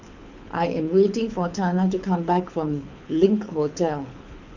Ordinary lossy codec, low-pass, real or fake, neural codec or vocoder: none; 7.2 kHz; fake; codec, 24 kHz, 6 kbps, HILCodec